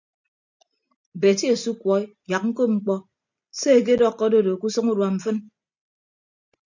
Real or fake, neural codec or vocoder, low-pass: real; none; 7.2 kHz